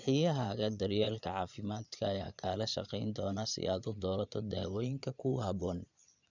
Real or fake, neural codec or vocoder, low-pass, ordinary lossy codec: fake; vocoder, 22.05 kHz, 80 mel bands, Vocos; 7.2 kHz; none